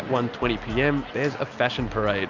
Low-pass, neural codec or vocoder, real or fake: 7.2 kHz; none; real